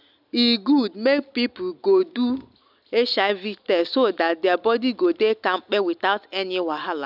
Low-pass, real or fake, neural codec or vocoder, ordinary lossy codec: 5.4 kHz; real; none; none